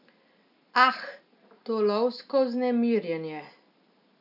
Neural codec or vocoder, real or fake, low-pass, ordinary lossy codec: none; real; 5.4 kHz; none